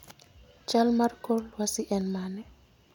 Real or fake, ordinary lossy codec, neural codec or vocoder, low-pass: real; none; none; 19.8 kHz